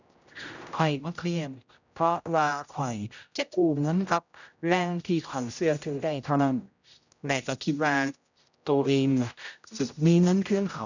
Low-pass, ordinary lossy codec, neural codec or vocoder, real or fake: 7.2 kHz; none; codec, 16 kHz, 0.5 kbps, X-Codec, HuBERT features, trained on general audio; fake